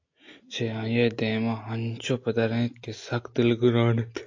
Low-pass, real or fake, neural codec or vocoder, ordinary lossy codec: 7.2 kHz; real; none; AAC, 48 kbps